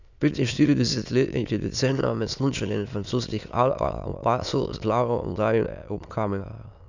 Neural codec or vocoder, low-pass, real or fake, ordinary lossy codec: autoencoder, 22.05 kHz, a latent of 192 numbers a frame, VITS, trained on many speakers; 7.2 kHz; fake; none